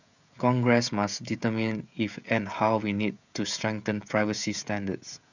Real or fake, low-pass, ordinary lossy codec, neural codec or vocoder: fake; 7.2 kHz; none; codec, 16 kHz, 16 kbps, FreqCodec, smaller model